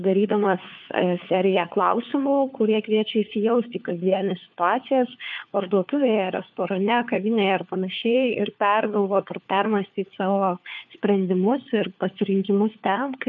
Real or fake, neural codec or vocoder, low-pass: fake; codec, 16 kHz, 4 kbps, FunCodec, trained on LibriTTS, 50 frames a second; 7.2 kHz